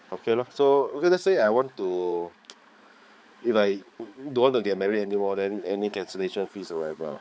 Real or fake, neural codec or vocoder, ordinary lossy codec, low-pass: fake; codec, 16 kHz, 4 kbps, X-Codec, HuBERT features, trained on balanced general audio; none; none